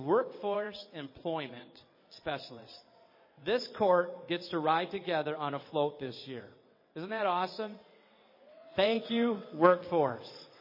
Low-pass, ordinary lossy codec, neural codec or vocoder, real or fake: 5.4 kHz; MP3, 24 kbps; vocoder, 22.05 kHz, 80 mel bands, WaveNeXt; fake